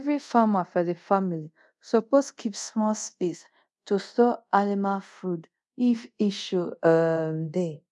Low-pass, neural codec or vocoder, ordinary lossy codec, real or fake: none; codec, 24 kHz, 0.5 kbps, DualCodec; none; fake